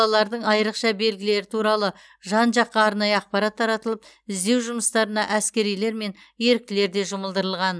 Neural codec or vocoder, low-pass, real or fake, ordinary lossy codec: none; none; real; none